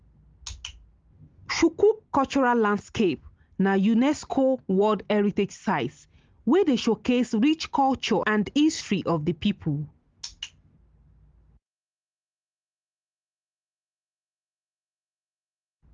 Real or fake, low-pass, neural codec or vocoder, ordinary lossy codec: real; 7.2 kHz; none; Opus, 32 kbps